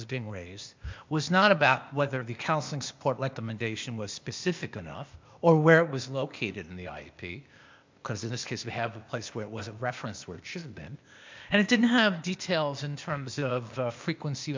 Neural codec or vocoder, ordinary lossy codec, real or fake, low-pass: codec, 16 kHz, 0.8 kbps, ZipCodec; MP3, 64 kbps; fake; 7.2 kHz